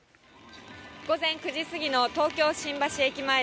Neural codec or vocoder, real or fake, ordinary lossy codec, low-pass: none; real; none; none